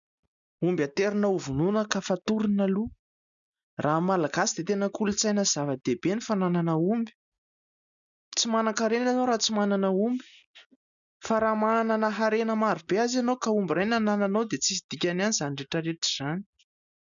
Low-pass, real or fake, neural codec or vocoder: 7.2 kHz; real; none